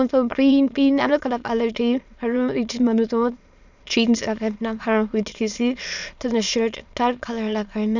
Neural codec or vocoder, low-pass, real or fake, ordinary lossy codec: autoencoder, 22.05 kHz, a latent of 192 numbers a frame, VITS, trained on many speakers; 7.2 kHz; fake; none